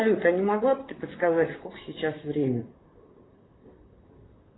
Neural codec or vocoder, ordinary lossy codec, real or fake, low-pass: vocoder, 44.1 kHz, 128 mel bands, Pupu-Vocoder; AAC, 16 kbps; fake; 7.2 kHz